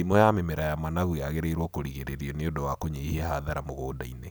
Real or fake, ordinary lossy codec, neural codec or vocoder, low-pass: real; none; none; none